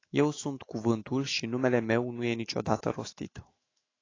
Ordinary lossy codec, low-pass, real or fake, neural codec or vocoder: AAC, 32 kbps; 7.2 kHz; fake; autoencoder, 48 kHz, 128 numbers a frame, DAC-VAE, trained on Japanese speech